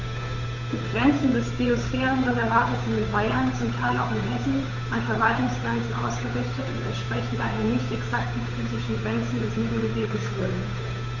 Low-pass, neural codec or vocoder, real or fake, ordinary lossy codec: 7.2 kHz; codec, 16 kHz, 8 kbps, FunCodec, trained on Chinese and English, 25 frames a second; fake; none